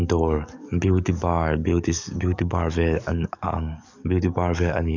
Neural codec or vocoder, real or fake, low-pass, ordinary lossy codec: codec, 44.1 kHz, 7.8 kbps, DAC; fake; 7.2 kHz; none